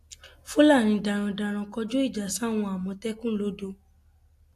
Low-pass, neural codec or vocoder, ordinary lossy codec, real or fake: 14.4 kHz; none; AAC, 64 kbps; real